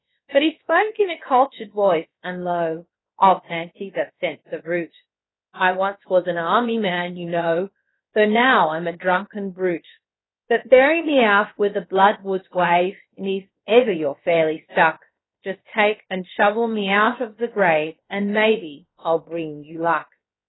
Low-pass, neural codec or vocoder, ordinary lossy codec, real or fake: 7.2 kHz; codec, 16 kHz, about 1 kbps, DyCAST, with the encoder's durations; AAC, 16 kbps; fake